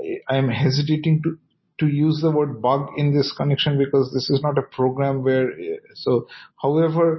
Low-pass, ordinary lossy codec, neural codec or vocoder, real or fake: 7.2 kHz; MP3, 24 kbps; none; real